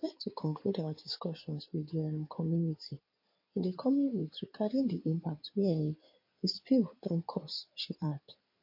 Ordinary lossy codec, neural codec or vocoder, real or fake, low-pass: MP3, 32 kbps; codec, 16 kHz in and 24 kHz out, 2.2 kbps, FireRedTTS-2 codec; fake; 5.4 kHz